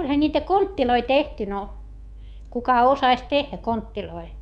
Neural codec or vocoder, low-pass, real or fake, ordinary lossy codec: codec, 24 kHz, 3.1 kbps, DualCodec; 10.8 kHz; fake; none